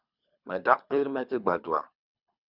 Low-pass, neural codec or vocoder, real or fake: 5.4 kHz; codec, 24 kHz, 3 kbps, HILCodec; fake